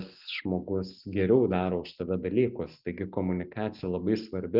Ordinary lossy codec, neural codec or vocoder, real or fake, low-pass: Opus, 24 kbps; none; real; 5.4 kHz